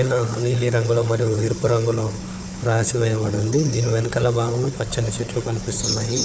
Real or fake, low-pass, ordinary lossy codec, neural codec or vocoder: fake; none; none; codec, 16 kHz, 4 kbps, FunCodec, trained on Chinese and English, 50 frames a second